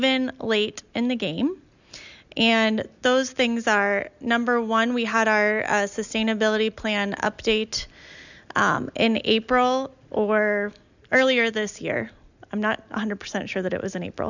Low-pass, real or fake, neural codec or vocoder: 7.2 kHz; real; none